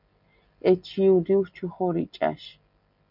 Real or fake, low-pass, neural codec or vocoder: real; 5.4 kHz; none